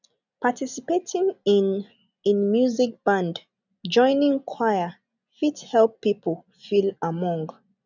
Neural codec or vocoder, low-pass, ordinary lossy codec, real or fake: none; 7.2 kHz; none; real